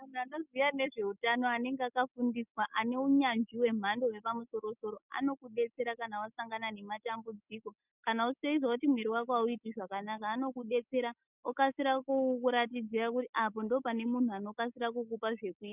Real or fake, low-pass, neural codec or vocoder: real; 3.6 kHz; none